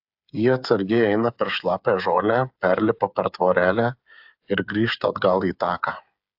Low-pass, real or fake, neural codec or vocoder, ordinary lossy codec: 5.4 kHz; fake; codec, 16 kHz, 8 kbps, FreqCodec, smaller model; AAC, 48 kbps